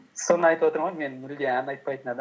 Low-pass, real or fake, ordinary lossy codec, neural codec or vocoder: none; real; none; none